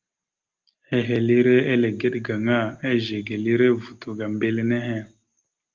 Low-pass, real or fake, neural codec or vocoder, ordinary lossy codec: 7.2 kHz; real; none; Opus, 24 kbps